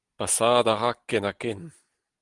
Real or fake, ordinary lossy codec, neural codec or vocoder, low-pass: real; Opus, 24 kbps; none; 10.8 kHz